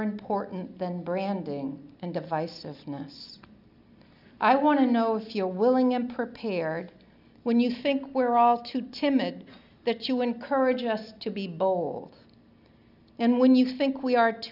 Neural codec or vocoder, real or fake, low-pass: none; real; 5.4 kHz